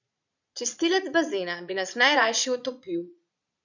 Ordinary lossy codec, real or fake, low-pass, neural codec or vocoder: none; fake; 7.2 kHz; vocoder, 22.05 kHz, 80 mel bands, Vocos